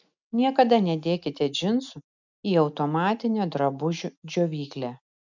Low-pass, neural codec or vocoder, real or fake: 7.2 kHz; none; real